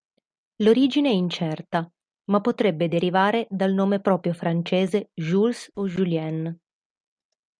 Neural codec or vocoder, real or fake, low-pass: none; real; 9.9 kHz